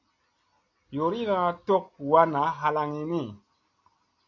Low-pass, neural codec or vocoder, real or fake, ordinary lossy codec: 7.2 kHz; none; real; AAC, 48 kbps